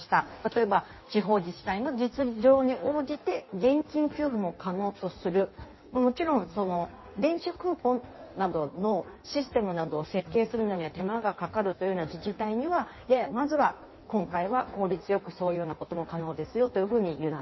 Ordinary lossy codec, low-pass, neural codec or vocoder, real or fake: MP3, 24 kbps; 7.2 kHz; codec, 16 kHz in and 24 kHz out, 1.1 kbps, FireRedTTS-2 codec; fake